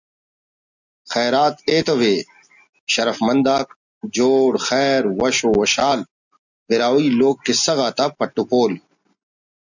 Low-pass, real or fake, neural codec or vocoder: 7.2 kHz; real; none